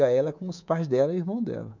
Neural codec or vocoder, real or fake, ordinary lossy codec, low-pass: codec, 24 kHz, 3.1 kbps, DualCodec; fake; none; 7.2 kHz